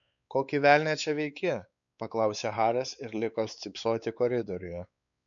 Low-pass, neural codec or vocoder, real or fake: 7.2 kHz; codec, 16 kHz, 4 kbps, X-Codec, WavLM features, trained on Multilingual LibriSpeech; fake